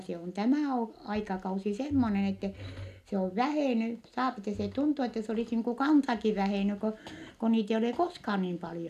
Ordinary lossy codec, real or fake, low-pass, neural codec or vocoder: none; real; 14.4 kHz; none